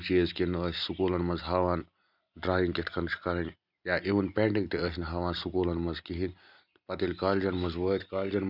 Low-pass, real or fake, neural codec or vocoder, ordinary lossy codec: 5.4 kHz; real; none; none